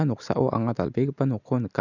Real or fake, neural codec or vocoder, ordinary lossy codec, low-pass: real; none; none; 7.2 kHz